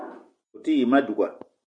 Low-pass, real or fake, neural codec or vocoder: 9.9 kHz; real; none